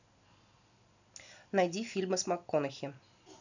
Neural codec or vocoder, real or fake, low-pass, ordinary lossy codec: none; real; 7.2 kHz; none